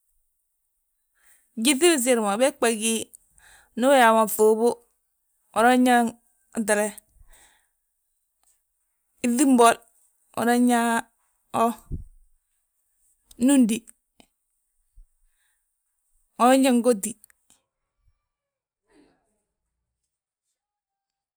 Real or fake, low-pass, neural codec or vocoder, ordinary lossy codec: real; none; none; none